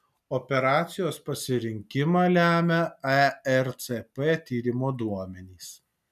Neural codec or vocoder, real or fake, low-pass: none; real; 14.4 kHz